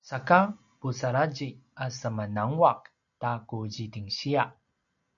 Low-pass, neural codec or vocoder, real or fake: 7.2 kHz; none; real